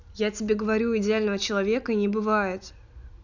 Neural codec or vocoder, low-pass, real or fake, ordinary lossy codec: none; 7.2 kHz; real; none